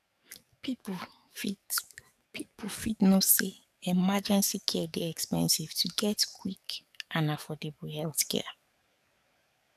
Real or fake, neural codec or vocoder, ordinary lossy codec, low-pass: fake; codec, 44.1 kHz, 7.8 kbps, DAC; none; 14.4 kHz